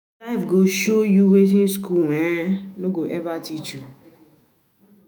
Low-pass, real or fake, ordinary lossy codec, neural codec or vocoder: none; fake; none; autoencoder, 48 kHz, 128 numbers a frame, DAC-VAE, trained on Japanese speech